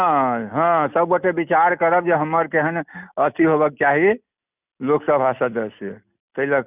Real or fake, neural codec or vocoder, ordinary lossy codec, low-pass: real; none; none; 3.6 kHz